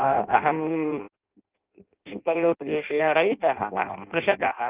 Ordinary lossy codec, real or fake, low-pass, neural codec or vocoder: Opus, 32 kbps; fake; 3.6 kHz; codec, 16 kHz in and 24 kHz out, 0.6 kbps, FireRedTTS-2 codec